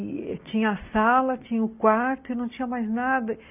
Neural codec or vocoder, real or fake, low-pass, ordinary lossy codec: none; real; 3.6 kHz; none